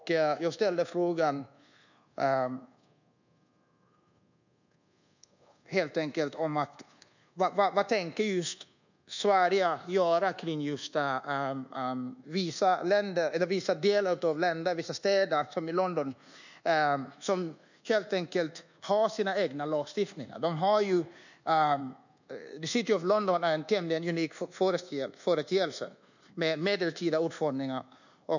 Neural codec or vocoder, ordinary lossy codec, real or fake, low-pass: codec, 24 kHz, 1.2 kbps, DualCodec; none; fake; 7.2 kHz